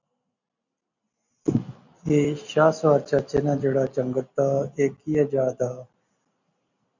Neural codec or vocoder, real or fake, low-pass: none; real; 7.2 kHz